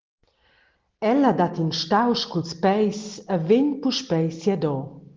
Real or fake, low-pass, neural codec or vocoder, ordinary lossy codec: real; 7.2 kHz; none; Opus, 16 kbps